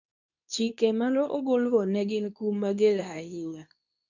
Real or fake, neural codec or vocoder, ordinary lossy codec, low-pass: fake; codec, 24 kHz, 0.9 kbps, WavTokenizer, medium speech release version 2; none; 7.2 kHz